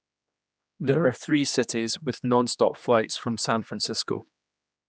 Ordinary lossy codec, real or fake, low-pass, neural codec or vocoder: none; fake; none; codec, 16 kHz, 2 kbps, X-Codec, HuBERT features, trained on general audio